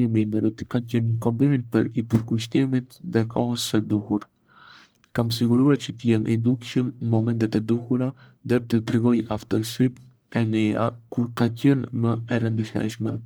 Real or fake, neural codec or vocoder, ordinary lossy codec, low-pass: fake; codec, 44.1 kHz, 1.7 kbps, Pupu-Codec; none; none